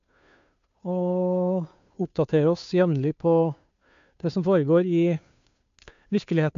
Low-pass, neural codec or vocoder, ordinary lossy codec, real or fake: 7.2 kHz; codec, 16 kHz, 2 kbps, FunCodec, trained on Chinese and English, 25 frames a second; AAC, 64 kbps; fake